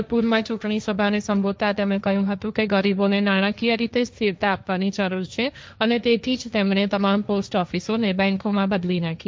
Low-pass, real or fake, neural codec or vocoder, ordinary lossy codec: none; fake; codec, 16 kHz, 1.1 kbps, Voila-Tokenizer; none